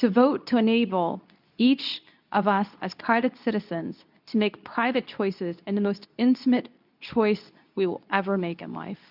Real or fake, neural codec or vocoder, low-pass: fake; codec, 24 kHz, 0.9 kbps, WavTokenizer, medium speech release version 2; 5.4 kHz